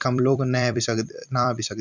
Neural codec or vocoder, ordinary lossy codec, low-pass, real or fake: vocoder, 44.1 kHz, 128 mel bands every 256 samples, BigVGAN v2; none; 7.2 kHz; fake